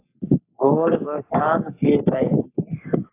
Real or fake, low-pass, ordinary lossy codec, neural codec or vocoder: fake; 3.6 kHz; AAC, 24 kbps; codec, 44.1 kHz, 3.4 kbps, Pupu-Codec